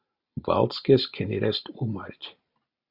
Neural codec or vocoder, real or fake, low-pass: none; real; 5.4 kHz